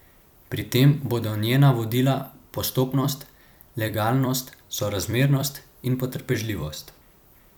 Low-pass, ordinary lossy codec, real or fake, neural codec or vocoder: none; none; fake; vocoder, 44.1 kHz, 128 mel bands every 256 samples, BigVGAN v2